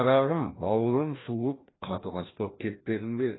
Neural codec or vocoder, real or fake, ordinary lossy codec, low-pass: codec, 16 kHz, 1 kbps, FreqCodec, larger model; fake; AAC, 16 kbps; 7.2 kHz